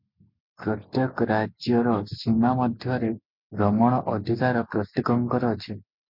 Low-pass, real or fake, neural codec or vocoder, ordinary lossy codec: 5.4 kHz; real; none; MP3, 48 kbps